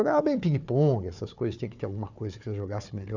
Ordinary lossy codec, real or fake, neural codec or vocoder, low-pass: none; fake; codec, 16 kHz, 4 kbps, FunCodec, trained on Chinese and English, 50 frames a second; 7.2 kHz